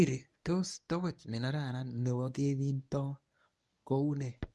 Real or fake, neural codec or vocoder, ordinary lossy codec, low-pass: fake; codec, 24 kHz, 0.9 kbps, WavTokenizer, medium speech release version 1; none; none